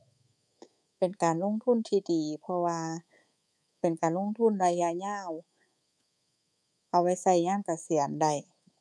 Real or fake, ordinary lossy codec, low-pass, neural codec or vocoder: fake; none; none; codec, 24 kHz, 3.1 kbps, DualCodec